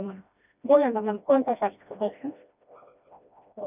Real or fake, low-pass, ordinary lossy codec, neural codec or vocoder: fake; 3.6 kHz; none; codec, 16 kHz, 1 kbps, FreqCodec, smaller model